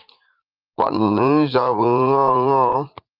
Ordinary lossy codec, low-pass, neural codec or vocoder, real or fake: Opus, 24 kbps; 5.4 kHz; vocoder, 44.1 kHz, 80 mel bands, Vocos; fake